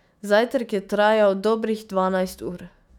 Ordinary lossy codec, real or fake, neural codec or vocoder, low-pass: none; fake; autoencoder, 48 kHz, 128 numbers a frame, DAC-VAE, trained on Japanese speech; 19.8 kHz